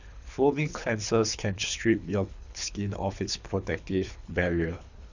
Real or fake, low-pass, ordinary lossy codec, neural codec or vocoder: fake; 7.2 kHz; none; codec, 24 kHz, 3 kbps, HILCodec